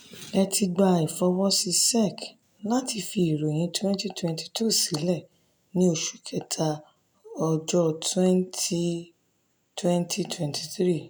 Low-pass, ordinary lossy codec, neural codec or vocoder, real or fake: none; none; none; real